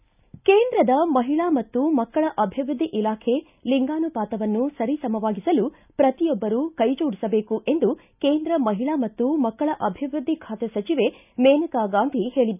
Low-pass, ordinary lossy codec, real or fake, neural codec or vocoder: 3.6 kHz; none; real; none